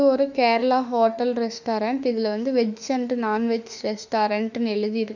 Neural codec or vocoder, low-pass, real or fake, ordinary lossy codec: autoencoder, 48 kHz, 32 numbers a frame, DAC-VAE, trained on Japanese speech; 7.2 kHz; fake; none